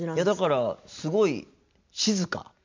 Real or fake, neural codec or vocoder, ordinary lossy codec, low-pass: real; none; MP3, 64 kbps; 7.2 kHz